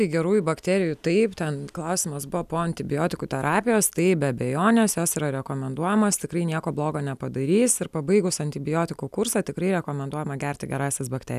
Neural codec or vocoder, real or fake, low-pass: none; real; 14.4 kHz